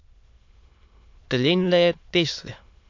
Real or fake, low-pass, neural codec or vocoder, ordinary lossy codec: fake; 7.2 kHz; autoencoder, 22.05 kHz, a latent of 192 numbers a frame, VITS, trained on many speakers; MP3, 48 kbps